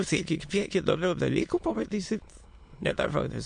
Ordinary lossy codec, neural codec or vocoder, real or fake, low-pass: MP3, 64 kbps; autoencoder, 22.05 kHz, a latent of 192 numbers a frame, VITS, trained on many speakers; fake; 9.9 kHz